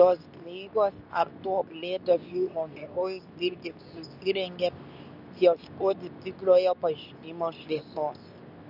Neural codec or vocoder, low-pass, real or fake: codec, 24 kHz, 0.9 kbps, WavTokenizer, medium speech release version 2; 5.4 kHz; fake